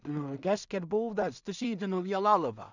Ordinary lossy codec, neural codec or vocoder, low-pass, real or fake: none; codec, 16 kHz in and 24 kHz out, 0.4 kbps, LongCat-Audio-Codec, two codebook decoder; 7.2 kHz; fake